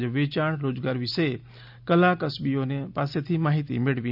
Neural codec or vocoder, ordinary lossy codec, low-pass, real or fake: none; none; 5.4 kHz; real